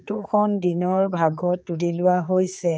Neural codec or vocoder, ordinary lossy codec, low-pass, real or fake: codec, 16 kHz, 4 kbps, X-Codec, HuBERT features, trained on general audio; none; none; fake